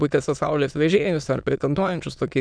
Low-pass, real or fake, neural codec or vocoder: 9.9 kHz; fake; autoencoder, 22.05 kHz, a latent of 192 numbers a frame, VITS, trained on many speakers